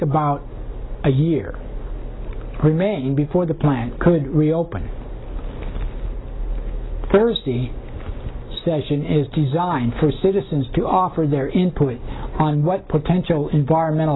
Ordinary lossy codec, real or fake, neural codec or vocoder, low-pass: AAC, 16 kbps; real; none; 7.2 kHz